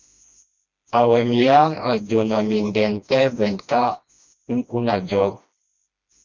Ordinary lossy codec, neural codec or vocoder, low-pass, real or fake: Opus, 64 kbps; codec, 16 kHz, 1 kbps, FreqCodec, smaller model; 7.2 kHz; fake